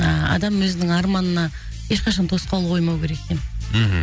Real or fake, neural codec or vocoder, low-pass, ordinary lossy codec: real; none; none; none